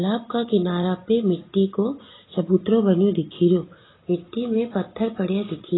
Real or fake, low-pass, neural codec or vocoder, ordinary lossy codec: real; 7.2 kHz; none; AAC, 16 kbps